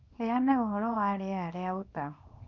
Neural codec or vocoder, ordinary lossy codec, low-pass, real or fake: codec, 24 kHz, 0.9 kbps, WavTokenizer, small release; none; 7.2 kHz; fake